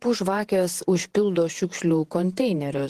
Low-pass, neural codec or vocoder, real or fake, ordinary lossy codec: 14.4 kHz; vocoder, 48 kHz, 128 mel bands, Vocos; fake; Opus, 16 kbps